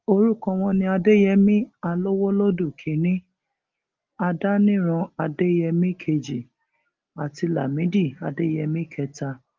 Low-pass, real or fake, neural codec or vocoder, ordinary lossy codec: 7.2 kHz; real; none; Opus, 32 kbps